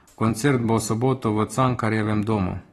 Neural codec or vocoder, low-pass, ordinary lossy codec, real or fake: vocoder, 44.1 kHz, 128 mel bands every 512 samples, BigVGAN v2; 19.8 kHz; AAC, 32 kbps; fake